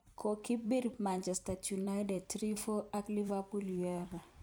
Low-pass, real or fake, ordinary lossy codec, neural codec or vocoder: none; fake; none; vocoder, 44.1 kHz, 128 mel bands every 256 samples, BigVGAN v2